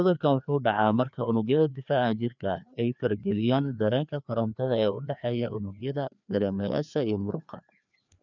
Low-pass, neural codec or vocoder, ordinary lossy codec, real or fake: 7.2 kHz; codec, 16 kHz, 2 kbps, FreqCodec, larger model; none; fake